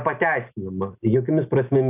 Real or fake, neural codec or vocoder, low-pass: real; none; 3.6 kHz